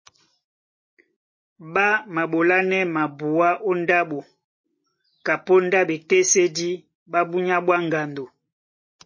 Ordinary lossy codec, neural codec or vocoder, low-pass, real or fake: MP3, 32 kbps; none; 7.2 kHz; real